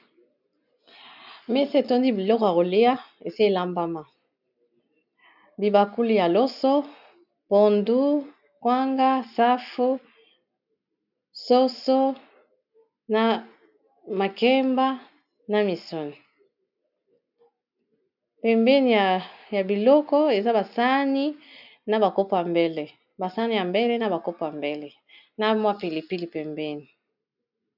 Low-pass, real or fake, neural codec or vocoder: 5.4 kHz; real; none